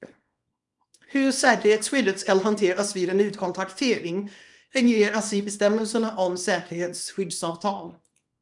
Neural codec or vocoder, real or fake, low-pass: codec, 24 kHz, 0.9 kbps, WavTokenizer, small release; fake; 10.8 kHz